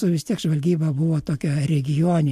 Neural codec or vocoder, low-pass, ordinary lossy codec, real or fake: none; 14.4 kHz; MP3, 64 kbps; real